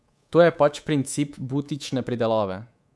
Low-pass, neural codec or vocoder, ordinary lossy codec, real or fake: none; codec, 24 kHz, 3.1 kbps, DualCodec; none; fake